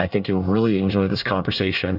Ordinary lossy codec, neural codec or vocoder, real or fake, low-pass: AAC, 48 kbps; codec, 24 kHz, 1 kbps, SNAC; fake; 5.4 kHz